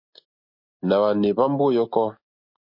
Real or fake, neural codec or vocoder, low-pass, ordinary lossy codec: real; none; 5.4 kHz; MP3, 32 kbps